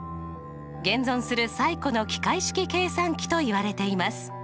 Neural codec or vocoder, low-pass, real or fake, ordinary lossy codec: none; none; real; none